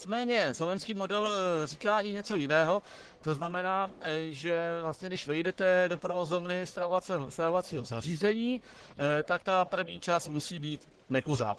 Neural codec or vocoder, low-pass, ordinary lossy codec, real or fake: codec, 44.1 kHz, 1.7 kbps, Pupu-Codec; 10.8 kHz; Opus, 16 kbps; fake